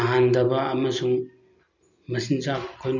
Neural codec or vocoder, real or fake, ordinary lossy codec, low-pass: none; real; none; 7.2 kHz